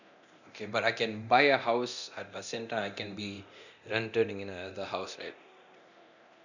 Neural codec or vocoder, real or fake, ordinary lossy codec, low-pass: codec, 24 kHz, 0.9 kbps, DualCodec; fake; none; 7.2 kHz